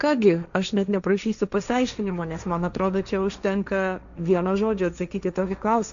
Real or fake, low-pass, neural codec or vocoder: fake; 7.2 kHz; codec, 16 kHz, 1.1 kbps, Voila-Tokenizer